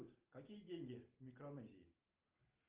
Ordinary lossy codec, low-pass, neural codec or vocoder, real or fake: Opus, 32 kbps; 3.6 kHz; none; real